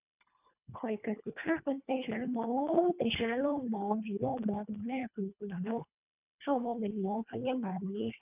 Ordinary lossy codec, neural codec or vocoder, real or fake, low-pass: none; codec, 24 kHz, 1.5 kbps, HILCodec; fake; 3.6 kHz